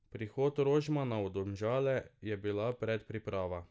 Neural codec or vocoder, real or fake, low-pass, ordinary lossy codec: none; real; none; none